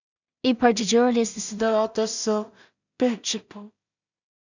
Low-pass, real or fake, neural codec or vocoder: 7.2 kHz; fake; codec, 16 kHz in and 24 kHz out, 0.4 kbps, LongCat-Audio-Codec, two codebook decoder